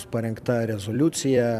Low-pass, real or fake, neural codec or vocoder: 14.4 kHz; fake; vocoder, 44.1 kHz, 128 mel bands every 256 samples, BigVGAN v2